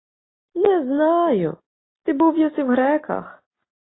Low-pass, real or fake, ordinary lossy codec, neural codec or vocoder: 7.2 kHz; real; AAC, 16 kbps; none